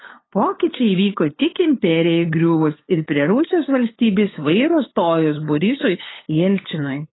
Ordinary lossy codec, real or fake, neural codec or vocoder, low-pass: AAC, 16 kbps; fake; codec, 16 kHz, 8 kbps, FunCodec, trained on LibriTTS, 25 frames a second; 7.2 kHz